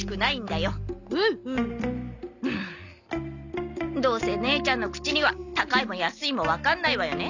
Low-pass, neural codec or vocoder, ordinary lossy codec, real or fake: 7.2 kHz; none; none; real